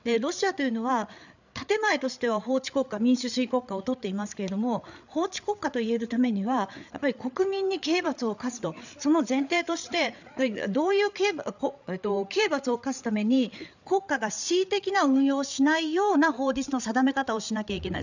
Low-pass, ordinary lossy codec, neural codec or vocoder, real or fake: 7.2 kHz; none; codec, 16 kHz, 8 kbps, FreqCodec, larger model; fake